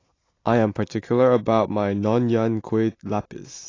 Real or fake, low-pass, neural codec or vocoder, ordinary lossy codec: real; 7.2 kHz; none; AAC, 32 kbps